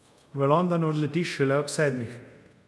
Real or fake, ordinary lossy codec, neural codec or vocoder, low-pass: fake; none; codec, 24 kHz, 0.5 kbps, DualCodec; none